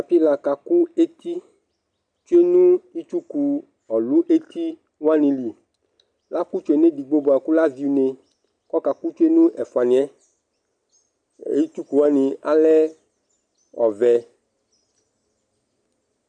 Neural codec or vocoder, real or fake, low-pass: none; real; 9.9 kHz